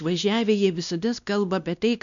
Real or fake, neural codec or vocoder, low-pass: fake; codec, 16 kHz, 1 kbps, X-Codec, WavLM features, trained on Multilingual LibriSpeech; 7.2 kHz